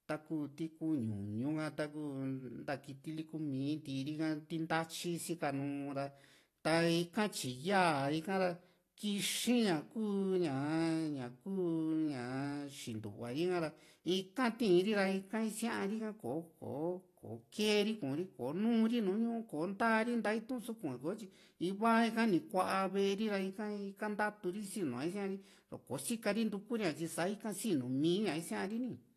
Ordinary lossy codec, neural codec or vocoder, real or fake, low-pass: AAC, 48 kbps; none; real; 14.4 kHz